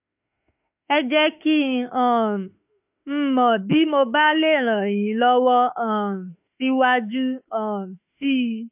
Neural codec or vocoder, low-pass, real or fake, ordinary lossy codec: autoencoder, 48 kHz, 32 numbers a frame, DAC-VAE, trained on Japanese speech; 3.6 kHz; fake; AAC, 32 kbps